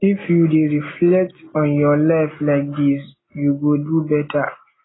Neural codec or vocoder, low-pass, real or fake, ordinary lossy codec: none; 7.2 kHz; real; AAC, 16 kbps